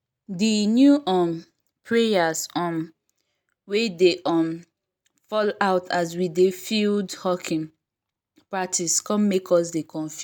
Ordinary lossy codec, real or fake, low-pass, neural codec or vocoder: none; real; 19.8 kHz; none